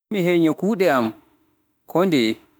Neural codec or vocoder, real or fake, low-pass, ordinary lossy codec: autoencoder, 48 kHz, 32 numbers a frame, DAC-VAE, trained on Japanese speech; fake; none; none